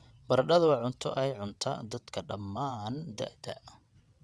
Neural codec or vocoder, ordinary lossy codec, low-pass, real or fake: vocoder, 22.05 kHz, 80 mel bands, WaveNeXt; none; none; fake